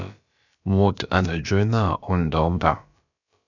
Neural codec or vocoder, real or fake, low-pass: codec, 16 kHz, about 1 kbps, DyCAST, with the encoder's durations; fake; 7.2 kHz